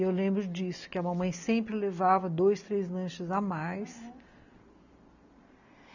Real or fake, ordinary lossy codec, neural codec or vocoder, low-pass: real; none; none; 7.2 kHz